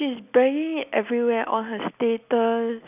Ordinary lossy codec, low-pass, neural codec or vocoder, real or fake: none; 3.6 kHz; none; real